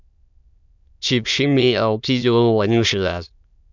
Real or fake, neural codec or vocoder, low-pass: fake; autoencoder, 22.05 kHz, a latent of 192 numbers a frame, VITS, trained on many speakers; 7.2 kHz